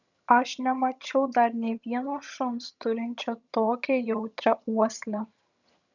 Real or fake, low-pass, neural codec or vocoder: fake; 7.2 kHz; vocoder, 22.05 kHz, 80 mel bands, HiFi-GAN